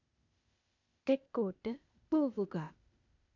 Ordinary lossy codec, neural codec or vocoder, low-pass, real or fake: none; codec, 16 kHz, 0.8 kbps, ZipCodec; 7.2 kHz; fake